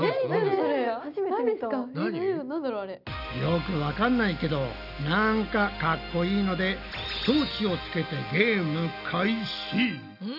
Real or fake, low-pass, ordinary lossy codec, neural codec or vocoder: real; 5.4 kHz; none; none